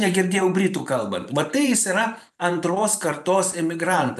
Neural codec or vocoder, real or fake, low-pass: vocoder, 44.1 kHz, 128 mel bands every 512 samples, BigVGAN v2; fake; 14.4 kHz